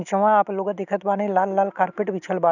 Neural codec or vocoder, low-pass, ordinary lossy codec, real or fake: none; 7.2 kHz; none; real